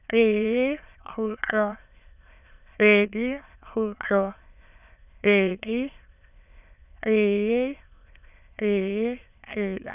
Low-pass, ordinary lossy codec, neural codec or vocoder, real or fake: 3.6 kHz; none; autoencoder, 22.05 kHz, a latent of 192 numbers a frame, VITS, trained on many speakers; fake